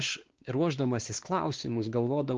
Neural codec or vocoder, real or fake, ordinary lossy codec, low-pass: codec, 16 kHz, 2 kbps, X-Codec, WavLM features, trained on Multilingual LibriSpeech; fake; Opus, 16 kbps; 7.2 kHz